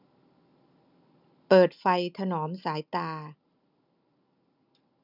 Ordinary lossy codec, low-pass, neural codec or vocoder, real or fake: none; 5.4 kHz; none; real